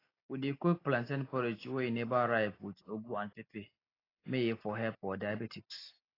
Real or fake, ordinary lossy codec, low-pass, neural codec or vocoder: real; AAC, 24 kbps; 5.4 kHz; none